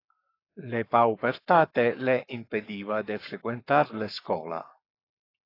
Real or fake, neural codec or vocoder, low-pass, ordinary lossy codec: fake; codec, 44.1 kHz, 7.8 kbps, Pupu-Codec; 5.4 kHz; AAC, 32 kbps